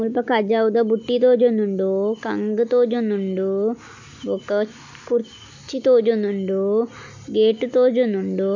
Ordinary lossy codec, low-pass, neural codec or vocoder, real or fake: MP3, 64 kbps; 7.2 kHz; none; real